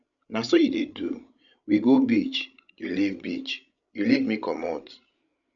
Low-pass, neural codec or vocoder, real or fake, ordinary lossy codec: 7.2 kHz; codec, 16 kHz, 16 kbps, FreqCodec, larger model; fake; none